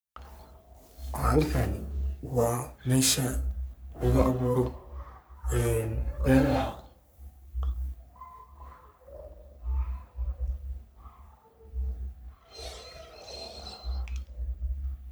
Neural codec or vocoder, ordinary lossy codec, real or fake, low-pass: codec, 44.1 kHz, 3.4 kbps, Pupu-Codec; none; fake; none